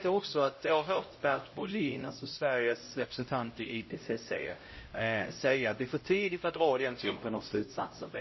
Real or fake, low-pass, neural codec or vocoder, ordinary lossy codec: fake; 7.2 kHz; codec, 16 kHz, 0.5 kbps, X-Codec, HuBERT features, trained on LibriSpeech; MP3, 24 kbps